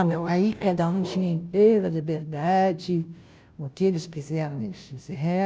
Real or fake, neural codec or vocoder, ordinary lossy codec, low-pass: fake; codec, 16 kHz, 0.5 kbps, FunCodec, trained on Chinese and English, 25 frames a second; none; none